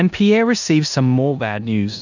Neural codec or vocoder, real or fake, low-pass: codec, 16 kHz in and 24 kHz out, 0.9 kbps, LongCat-Audio-Codec, four codebook decoder; fake; 7.2 kHz